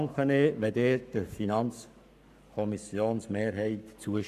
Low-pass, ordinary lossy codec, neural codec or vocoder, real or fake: 14.4 kHz; none; codec, 44.1 kHz, 7.8 kbps, Pupu-Codec; fake